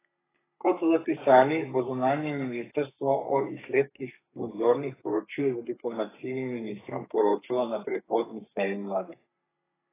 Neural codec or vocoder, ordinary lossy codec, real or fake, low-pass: codec, 32 kHz, 1.9 kbps, SNAC; AAC, 16 kbps; fake; 3.6 kHz